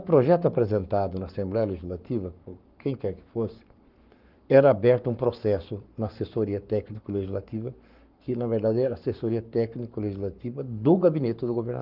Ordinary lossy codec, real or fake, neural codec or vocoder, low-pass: Opus, 32 kbps; fake; codec, 44.1 kHz, 7.8 kbps, Pupu-Codec; 5.4 kHz